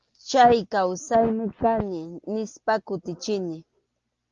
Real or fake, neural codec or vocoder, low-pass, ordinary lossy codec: real; none; 7.2 kHz; Opus, 32 kbps